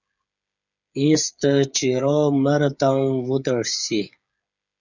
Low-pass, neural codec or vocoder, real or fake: 7.2 kHz; codec, 16 kHz, 8 kbps, FreqCodec, smaller model; fake